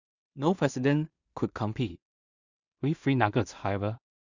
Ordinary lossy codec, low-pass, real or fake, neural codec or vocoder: Opus, 64 kbps; 7.2 kHz; fake; codec, 16 kHz in and 24 kHz out, 0.4 kbps, LongCat-Audio-Codec, two codebook decoder